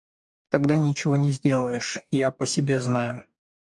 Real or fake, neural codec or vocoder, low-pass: fake; codec, 44.1 kHz, 2.6 kbps, DAC; 10.8 kHz